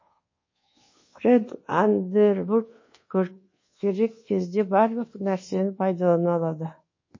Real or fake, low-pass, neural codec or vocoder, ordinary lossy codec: fake; 7.2 kHz; codec, 24 kHz, 1.2 kbps, DualCodec; MP3, 32 kbps